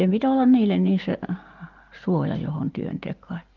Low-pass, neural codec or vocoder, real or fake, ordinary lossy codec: 7.2 kHz; none; real; Opus, 24 kbps